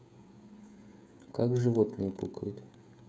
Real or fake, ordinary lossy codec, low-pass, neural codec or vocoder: fake; none; none; codec, 16 kHz, 16 kbps, FreqCodec, smaller model